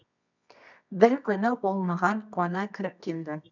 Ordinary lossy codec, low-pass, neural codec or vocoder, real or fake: none; 7.2 kHz; codec, 24 kHz, 0.9 kbps, WavTokenizer, medium music audio release; fake